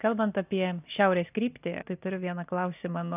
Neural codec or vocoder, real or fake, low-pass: none; real; 3.6 kHz